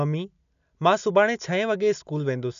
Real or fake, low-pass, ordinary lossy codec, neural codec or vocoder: real; 7.2 kHz; none; none